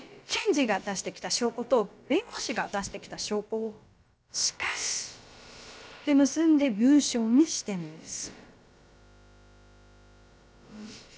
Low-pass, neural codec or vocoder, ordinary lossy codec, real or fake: none; codec, 16 kHz, about 1 kbps, DyCAST, with the encoder's durations; none; fake